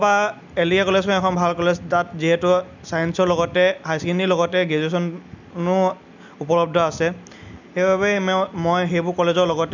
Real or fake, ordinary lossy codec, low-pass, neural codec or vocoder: real; none; 7.2 kHz; none